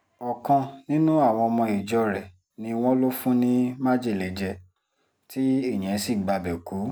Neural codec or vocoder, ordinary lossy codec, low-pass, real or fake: none; none; none; real